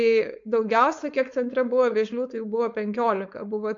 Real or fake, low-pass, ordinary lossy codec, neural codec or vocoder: fake; 7.2 kHz; MP3, 48 kbps; codec, 16 kHz, 4.8 kbps, FACodec